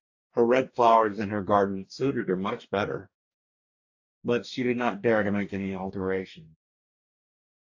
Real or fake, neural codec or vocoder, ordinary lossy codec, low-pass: fake; codec, 44.1 kHz, 2.6 kbps, DAC; AAC, 48 kbps; 7.2 kHz